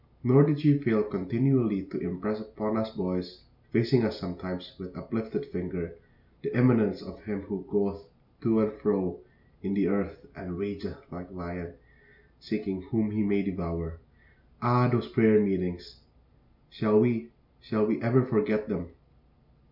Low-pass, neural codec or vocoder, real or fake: 5.4 kHz; none; real